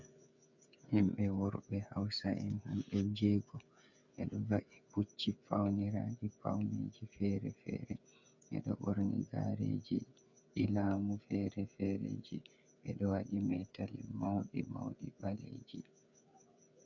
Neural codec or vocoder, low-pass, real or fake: codec, 16 kHz, 8 kbps, FreqCodec, smaller model; 7.2 kHz; fake